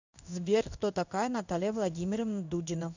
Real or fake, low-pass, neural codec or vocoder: fake; 7.2 kHz; codec, 16 kHz in and 24 kHz out, 1 kbps, XY-Tokenizer